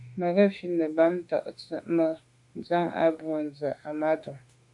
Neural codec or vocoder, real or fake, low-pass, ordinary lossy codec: autoencoder, 48 kHz, 32 numbers a frame, DAC-VAE, trained on Japanese speech; fake; 10.8 kHz; MP3, 64 kbps